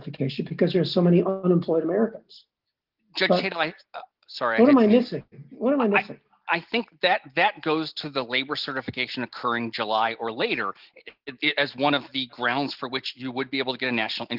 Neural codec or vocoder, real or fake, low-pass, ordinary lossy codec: none; real; 5.4 kHz; Opus, 32 kbps